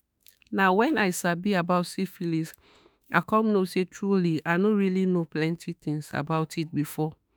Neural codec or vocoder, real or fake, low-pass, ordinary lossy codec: autoencoder, 48 kHz, 32 numbers a frame, DAC-VAE, trained on Japanese speech; fake; none; none